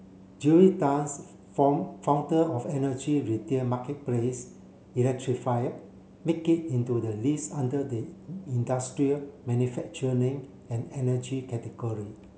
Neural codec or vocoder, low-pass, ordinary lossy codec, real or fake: none; none; none; real